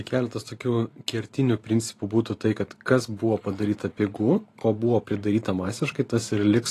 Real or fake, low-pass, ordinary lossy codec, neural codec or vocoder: fake; 14.4 kHz; AAC, 48 kbps; vocoder, 44.1 kHz, 128 mel bands every 512 samples, BigVGAN v2